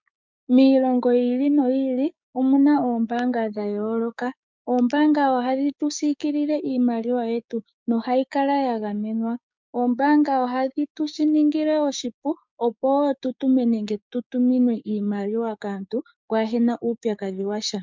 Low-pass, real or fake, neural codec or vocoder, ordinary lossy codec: 7.2 kHz; fake; codec, 16 kHz, 6 kbps, DAC; MP3, 64 kbps